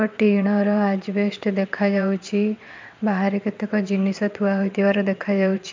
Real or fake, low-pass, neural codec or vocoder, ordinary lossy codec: fake; 7.2 kHz; vocoder, 22.05 kHz, 80 mel bands, WaveNeXt; MP3, 64 kbps